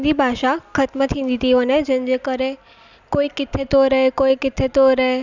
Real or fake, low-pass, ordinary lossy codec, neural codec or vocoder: real; 7.2 kHz; none; none